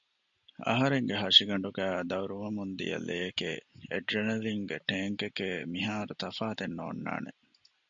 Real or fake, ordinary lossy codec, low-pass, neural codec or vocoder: real; MP3, 64 kbps; 7.2 kHz; none